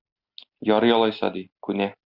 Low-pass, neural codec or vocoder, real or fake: 5.4 kHz; none; real